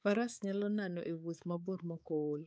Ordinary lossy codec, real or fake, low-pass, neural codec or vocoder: none; fake; none; codec, 16 kHz, 4 kbps, X-Codec, HuBERT features, trained on balanced general audio